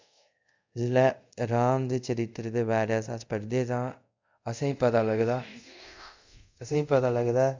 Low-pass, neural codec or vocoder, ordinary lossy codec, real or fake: 7.2 kHz; codec, 24 kHz, 0.5 kbps, DualCodec; MP3, 64 kbps; fake